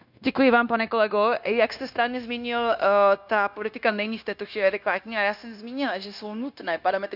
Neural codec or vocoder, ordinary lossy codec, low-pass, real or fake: codec, 16 kHz, 0.9 kbps, LongCat-Audio-Codec; none; 5.4 kHz; fake